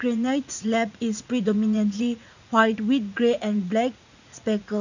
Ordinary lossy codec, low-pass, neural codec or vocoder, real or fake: none; 7.2 kHz; vocoder, 44.1 kHz, 80 mel bands, Vocos; fake